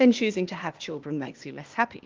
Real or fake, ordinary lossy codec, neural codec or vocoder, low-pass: fake; Opus, 32 kbps; codec, 16 kHz, 0.8 kbps, ZipCodec; 7.2 kHz